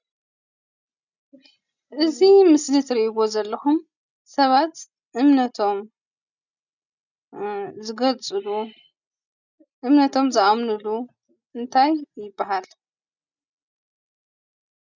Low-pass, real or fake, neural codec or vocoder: 7.2 kHz; real; none